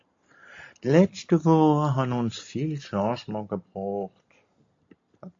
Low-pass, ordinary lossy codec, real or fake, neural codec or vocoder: 7.2 kHz; AAC, 48 kbps; real; none